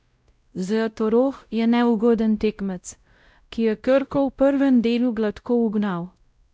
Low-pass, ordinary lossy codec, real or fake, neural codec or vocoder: none; none; fake; codec, 16 kHz, 0.5 kbps, X-Codec, WavLM features, trained on Multilingual LibriSpeech